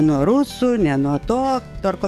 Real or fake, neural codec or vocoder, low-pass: fake; codec, 44.1 kHz, 7.8 kbps, DAC; 14.4 kHz